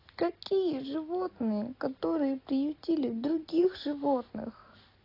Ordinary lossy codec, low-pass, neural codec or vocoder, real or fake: AAC, 24 kbps; 5.4 kHz; none; real